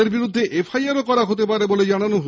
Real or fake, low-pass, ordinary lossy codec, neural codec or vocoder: real; none; none; none